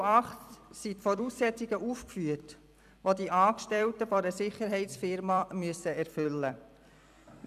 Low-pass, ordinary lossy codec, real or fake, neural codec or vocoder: 14.4 kHz; none; fake; vocoder, 44.1 kHz, 128 mel bands every 256 samples, BigVGAN v2